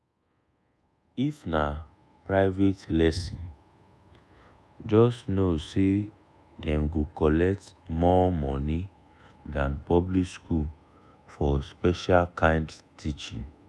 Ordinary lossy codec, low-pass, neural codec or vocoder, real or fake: none; none; codec, 24 kHz, 1.2 kbps, DualCodec; fake